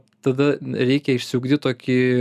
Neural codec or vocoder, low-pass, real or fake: none; 14.4 kHz; real